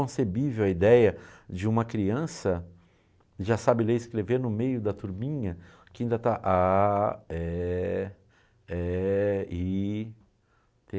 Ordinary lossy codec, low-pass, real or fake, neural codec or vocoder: none; none; real; none